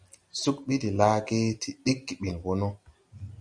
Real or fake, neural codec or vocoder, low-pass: real; none; 9.9 kHz